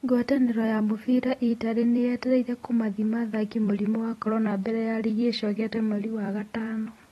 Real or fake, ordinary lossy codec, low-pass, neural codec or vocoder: fake; AAC, 32 kbps; 19.8 kHz; vocoder, 44.1 kHz, 128 mel bands every 256 samples, BigVGAN v2